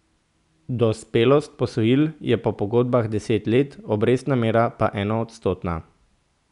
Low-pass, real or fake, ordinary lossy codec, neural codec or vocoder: 10.8 kHz; real; none; none